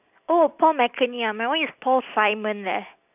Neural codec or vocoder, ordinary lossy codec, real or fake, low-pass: none; none; real; 3.6 kHz